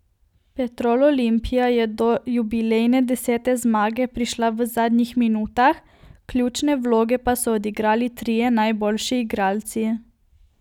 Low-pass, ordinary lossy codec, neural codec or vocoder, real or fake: 19.8 kHz; none; none; real